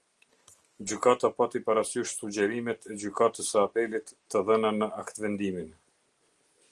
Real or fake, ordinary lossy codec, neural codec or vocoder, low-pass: real; Opus, 24 kbps; none; 10.8 kHz